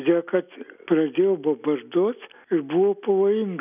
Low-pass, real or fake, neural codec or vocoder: 3.6 kHz; real; none